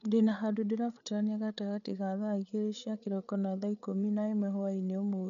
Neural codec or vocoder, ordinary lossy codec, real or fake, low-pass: codec, 16 kHz, 8 kbps, FreqCodec, larger model; none; fake; 7.2 kHz